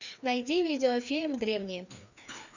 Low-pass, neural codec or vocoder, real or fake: 7.2 kHz; codec, 16 kHz, 2 kbps, FreqCodec, larger model; fake